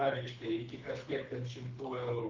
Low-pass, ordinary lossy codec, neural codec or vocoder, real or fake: 7.2 kHz; Opus, 24 kbps; codec, 24 kHz, 3 kbps, HILCodec; fake